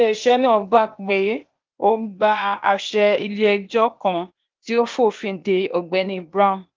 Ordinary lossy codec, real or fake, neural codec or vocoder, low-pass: Opus, 32 kbps; fake; codec, 16 kHz, 0.8 kbps, ZipCodec; 7.2 kHz